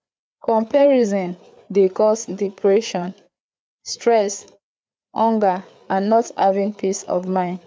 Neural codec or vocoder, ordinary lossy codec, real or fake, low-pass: codec, 16 kHz, 4 kbps, FreqCodec, larger model; none; fake; none